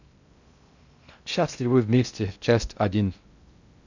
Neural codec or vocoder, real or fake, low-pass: codec, 16 kHz in and 24 kHz out, 0.6 kbps, FocalCodec, streaming, 2048 codes; fake; 7.2 kHz